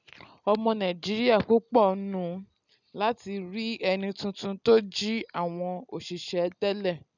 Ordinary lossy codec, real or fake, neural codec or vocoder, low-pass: none; real; none; 7.2 kHz